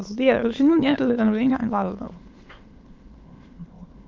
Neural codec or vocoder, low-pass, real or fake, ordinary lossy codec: autoencoder, 22.05 kHz, a latent of 192 numbers a frame, VITS, trained on many speakers; 7.2 kHz; fake; Opus, 32 kbps